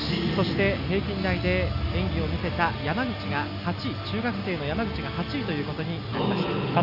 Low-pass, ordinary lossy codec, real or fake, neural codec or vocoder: 5.4 kHz; none; real; none